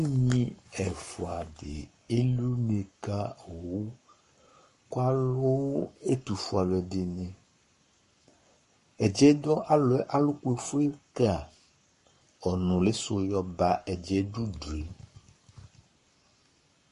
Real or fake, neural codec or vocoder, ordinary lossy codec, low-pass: fake; codec, 44.1 kHz, 7.8 kbps, Pupu-Codec; MP3, 48 kbps; 14.4 kHz